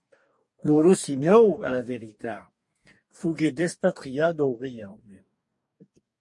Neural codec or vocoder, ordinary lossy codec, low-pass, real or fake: codec, 44.1 kHz, 2.6 kbps, DAC; MP3, 48 kbps; 10.8 kHz; fake